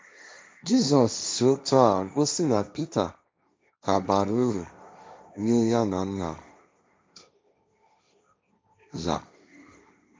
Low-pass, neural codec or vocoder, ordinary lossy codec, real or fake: none; codec, 16 kHz, 1.1 kbps, Voila-Tokenizer; none; fake